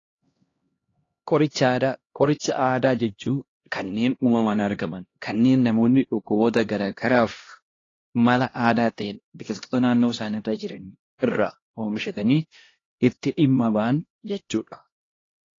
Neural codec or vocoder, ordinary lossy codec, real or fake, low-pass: codec, 16 kHz, 1 kbps, X-Codec, HuBERT features, trained on LibriSpeech; AAC, 32 kbps; fake; 7.2 kHz